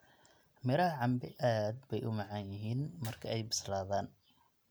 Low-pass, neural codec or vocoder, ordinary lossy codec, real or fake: none; none; none; real